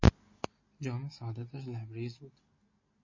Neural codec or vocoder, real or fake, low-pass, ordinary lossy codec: none; real; 7.2 kHz; MP3, 32 kbps